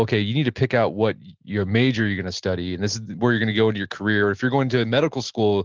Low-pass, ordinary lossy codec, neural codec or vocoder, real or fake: 7.2 kHz; Opus, 16 kbps; none; real